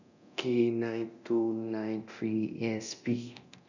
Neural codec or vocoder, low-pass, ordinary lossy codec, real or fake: codec, 24 kHz, 0.9 kbps, DualCodec; 7.2 kHz; none; fake